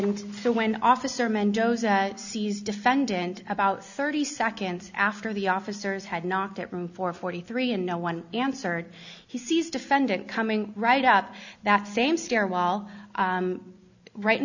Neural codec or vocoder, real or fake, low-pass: none; real; 7.2 kHz